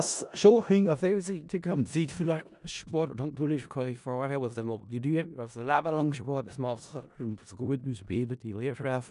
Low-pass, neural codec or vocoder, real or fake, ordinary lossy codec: 10.8 kHz; codec, 16 kHz in and 24 kHz out, 0.4 kbps, LongCat-Audio-Codec, four codebook decoder; fake; none